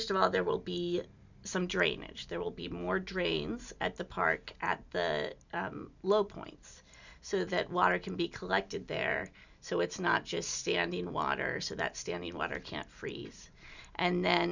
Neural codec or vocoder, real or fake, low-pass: none; real; 7.2 kHz